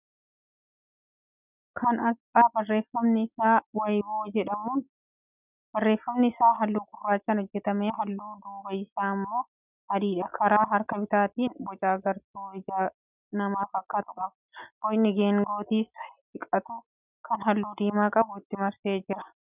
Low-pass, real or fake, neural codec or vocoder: 3.6 kHz; real; none